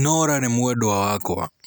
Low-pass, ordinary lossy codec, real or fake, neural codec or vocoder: none; none; real; none